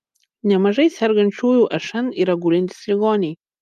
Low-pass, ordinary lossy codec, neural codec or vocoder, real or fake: 14.4 kHz; Opus, 32 kbps; none; real